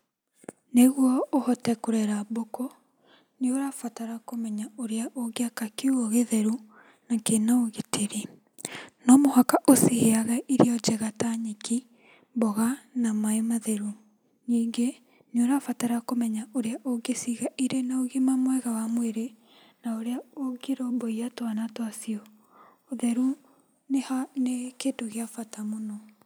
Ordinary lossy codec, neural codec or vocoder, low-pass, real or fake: none; none; none; real